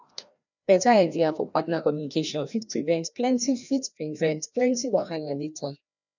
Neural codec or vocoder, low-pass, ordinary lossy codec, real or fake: codec, 16 kHz, 1 kbps, FreqCodec, larger model; 7.2 kHz; none; fake